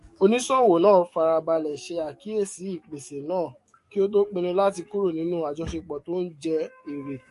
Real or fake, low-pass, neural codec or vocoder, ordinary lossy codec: fake; 14.4 kHz; codec, 44.1 kHz, 7.8 kbps, DAC; MP3, 48 kbps